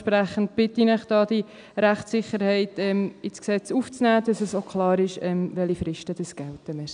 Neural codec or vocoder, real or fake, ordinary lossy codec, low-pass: none; real; MP3, 96 kbps; 9.9 kHz